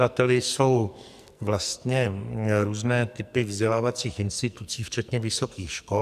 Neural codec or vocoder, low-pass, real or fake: codec, 44.1 kHz, 2.6 kbps, SNAC; 14.4 kHz; fake